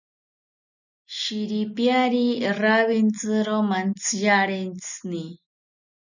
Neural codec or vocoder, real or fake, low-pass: none; real; 7.2 kHz